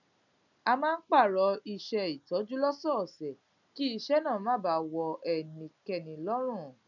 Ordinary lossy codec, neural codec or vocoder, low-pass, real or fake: none; none; 7.2 kHz; real